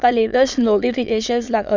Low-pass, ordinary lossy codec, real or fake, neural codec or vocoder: 7.2 kHz; none; fake; autoencoder, 22.05 kHz, a latent of 192 numbers a frame, VITS, trained on many speakers